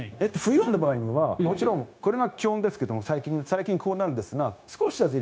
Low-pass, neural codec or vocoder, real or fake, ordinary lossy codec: none; codec, 16 kHz, 0.9 kbps, LongCat-Audio-Codec; fake; none